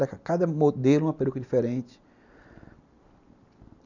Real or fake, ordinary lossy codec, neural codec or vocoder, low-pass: real; none; none; 7.2 kHz